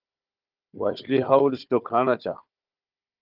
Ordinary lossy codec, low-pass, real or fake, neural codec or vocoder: Opus, 32 kbps; 5.4 kHz; fake; codec, 16 kHz, 4 kbps, FunCodec, trained on Chinese and English, 50 frames a second